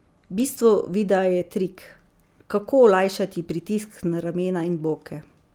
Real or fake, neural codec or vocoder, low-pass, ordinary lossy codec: real; none; 19.8 kHz; Opus, 32 kbps